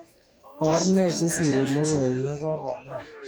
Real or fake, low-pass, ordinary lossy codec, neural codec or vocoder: fake; none; none; codec, 44.1 kHz, 2.6 kbps, DAC